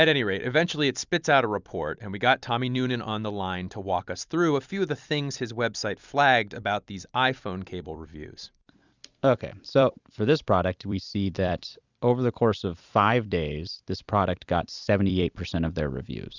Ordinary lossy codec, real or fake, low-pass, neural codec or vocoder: Opus, 64 kbps; fake; 7.2 kHz; vocoder, 44.1 kHz, 128 mel bands every 256 samples, BigVGAN v2